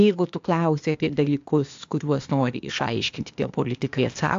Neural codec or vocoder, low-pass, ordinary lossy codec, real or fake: codec, 16 kHz, 0.8 kbps, ZipCodec; 7.2 kHz; AAC, 64 kbps; fake